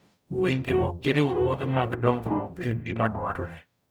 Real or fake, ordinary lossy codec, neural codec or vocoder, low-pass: fake; none; codec, 44.1 kHz, 0.9 kbps, DAC; none